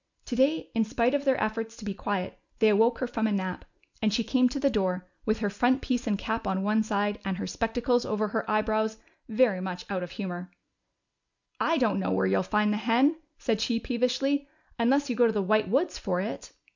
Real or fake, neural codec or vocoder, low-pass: real; none; 7.2 kHz